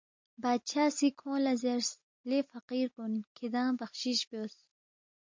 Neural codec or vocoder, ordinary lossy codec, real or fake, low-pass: none; MP3, 48 kbps; real; 7.2 kHz